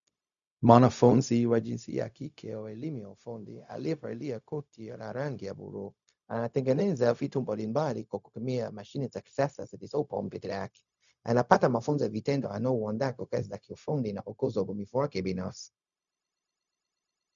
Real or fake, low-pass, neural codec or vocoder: fake; 7.2 kHz; codec, 16 kHz, 0.4 kbps, LongCat-Audio-Codec